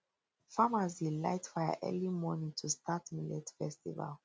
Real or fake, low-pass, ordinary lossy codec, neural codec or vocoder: real; none; none; none